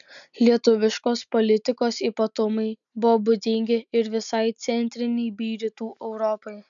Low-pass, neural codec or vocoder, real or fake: 7.2 kHz; none; real